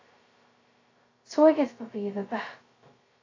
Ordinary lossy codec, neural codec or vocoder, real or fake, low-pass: AAC, 32 kbps; codec, 16 kHz, 0.2 kbps, FocalCodec; fake; 7.2 kHz